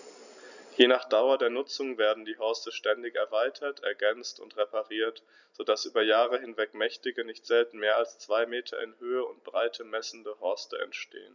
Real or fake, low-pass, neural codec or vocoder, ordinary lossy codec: real; 7.2 kHz; none; none